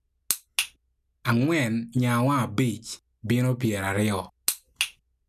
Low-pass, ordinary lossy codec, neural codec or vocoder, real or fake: 14.4 kHz; none; none; real